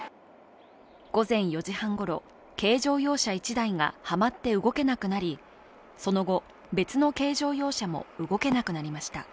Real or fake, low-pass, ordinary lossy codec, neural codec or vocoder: real; none; none; none